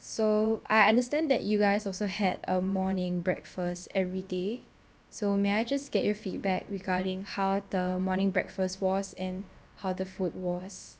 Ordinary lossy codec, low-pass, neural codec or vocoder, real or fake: none; none; codec, 16 kHz, about 1 kbps, DyCAST, with the encoder's durations; fake